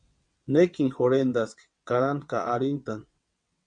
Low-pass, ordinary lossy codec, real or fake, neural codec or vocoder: 9.9 kHz; MP3, 64 kbps; fake; vocoder, 22.05 kHz, 80 mel bands, WaveNeXt